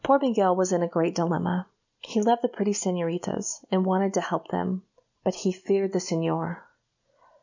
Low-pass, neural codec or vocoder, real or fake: 7.2 kHz; none; real